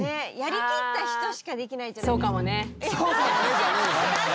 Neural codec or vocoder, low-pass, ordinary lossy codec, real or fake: none; none; none; real